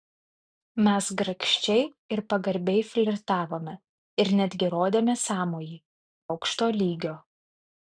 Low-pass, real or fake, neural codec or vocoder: 9.9 kHz; real; none